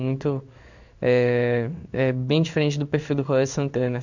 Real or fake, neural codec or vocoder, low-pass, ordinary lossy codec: fake; codec, 16 kHz in and 24 kHz out, 1 kbps, XY-Tokenizer; 7.2 kHz; none